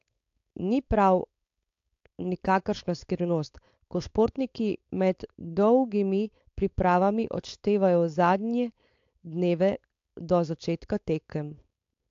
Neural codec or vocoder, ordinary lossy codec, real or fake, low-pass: codec, 16 kHz, 4.8 kbps, FACodec; AAC, 48 kbps; fake; 7.2 kHz